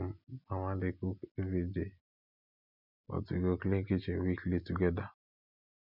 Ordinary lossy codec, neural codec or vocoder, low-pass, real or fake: none; none; 5.4 kHz; real